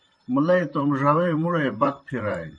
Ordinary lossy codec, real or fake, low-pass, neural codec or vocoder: MP3, 48 kbps; fake; 9.9 kHz; vocoder, 44.1 kHz, 128 mel bands, Pupu-Vocoder